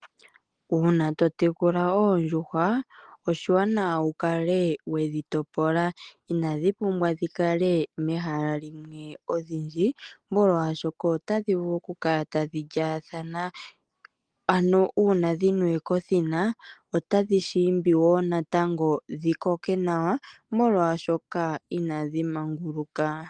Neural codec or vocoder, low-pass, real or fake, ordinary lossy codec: none; 9.9 kHz; real; Opus, 24 kbps